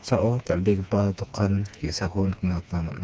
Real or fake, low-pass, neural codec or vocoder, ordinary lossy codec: fake; none; codec, 16 kHz, 2 kbps, FreqCodec, smaller model; none